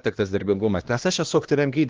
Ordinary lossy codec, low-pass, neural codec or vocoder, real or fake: Opus, 16 kbps; 7.2 kHz; codec, 16 kHz, 1 kbps, X-Codec, HuBERT features, trained on LibriSpeech; fake